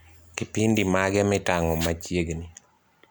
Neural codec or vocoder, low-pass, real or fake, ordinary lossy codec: none; none; real; none